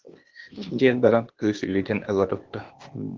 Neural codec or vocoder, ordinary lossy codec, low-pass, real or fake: codec, 16 kHz, 0.8 kbps, ZipCodec; Opus, 16 kbps; 7.2 kHz; fake